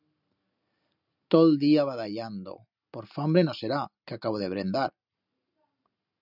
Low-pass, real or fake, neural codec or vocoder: 5.4 kHz; real; none